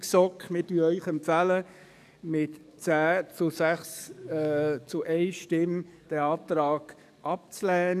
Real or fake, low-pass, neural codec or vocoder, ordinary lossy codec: fake; 14.4 kHz; codec, 44.1 kHz, 7.8 kbps, DAC; none